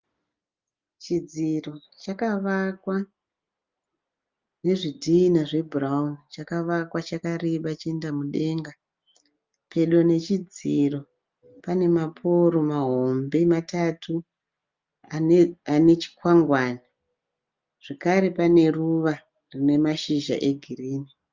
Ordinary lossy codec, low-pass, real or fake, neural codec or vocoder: Opus, 24 kbps; 7.2 kHz; real; none